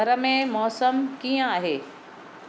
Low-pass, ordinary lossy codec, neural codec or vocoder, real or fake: none; none; none; real